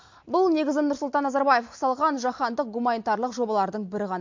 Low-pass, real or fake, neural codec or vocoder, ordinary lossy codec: 7.2 kHz; real; none; MP3, 48 kbps